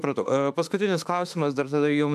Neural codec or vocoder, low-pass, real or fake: autoencoder, 48 kHz, 32 numbers a frame, DAC-VAE, trained on Japanese speech; 14.4 kHz; fake